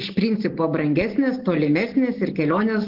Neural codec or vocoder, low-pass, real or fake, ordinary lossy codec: none; 5.4 kHz; real; Opus, 16 kbps